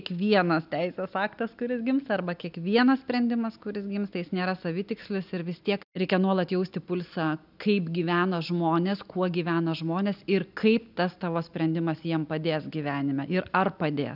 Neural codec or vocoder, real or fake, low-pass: none; real; 5.4 kHz